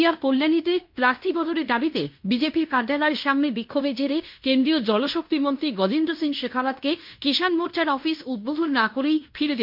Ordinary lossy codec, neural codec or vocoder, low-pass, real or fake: MP3, 48 kbps; codec, 16 kHz in and 24 kHz out, 0.9 kbps, LongCat-Audio-Codec, fine tuned four codebook decoder; 5.4 kHz; fake